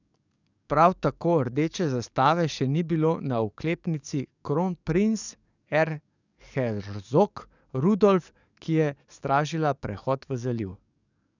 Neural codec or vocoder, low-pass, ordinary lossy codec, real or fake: codec, 44.1 kHz, 7.8 kbps, DAC; 7.2 kHz; none; fake